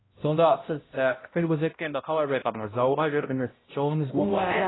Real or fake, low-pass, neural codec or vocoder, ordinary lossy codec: fake; 7.2 kHz; codec, 16 kHz, 0.5 kbps, X-Codec, HuBERT features, trained on balanced general audio; AAC, 16 kbps